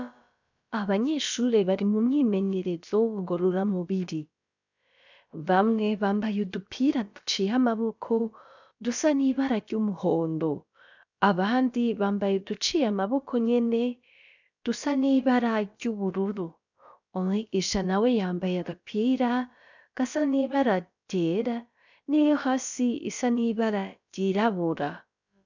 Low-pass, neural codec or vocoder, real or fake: 7.2 kHz; codec, 16 kHz, about 1 kbps, DyCAST, with the encoder's durations; fake